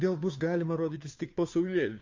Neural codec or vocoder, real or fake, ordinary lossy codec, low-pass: codec, 16 kHz, 2 kbps, FunCodec, trained on Chinese and English, 25 frames a second; fake; MP3, 48 kbps; 7.2 kHz